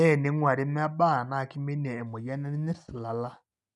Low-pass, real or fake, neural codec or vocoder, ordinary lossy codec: 10.8 kHz; real; none; none